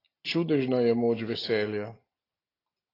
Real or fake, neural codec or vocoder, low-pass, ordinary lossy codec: real; none; 5.4 kHz; AAC, 24 kbps